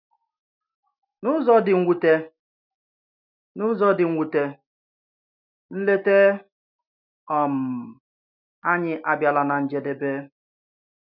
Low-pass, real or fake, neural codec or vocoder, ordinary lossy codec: 5.4 kHz; real; none; none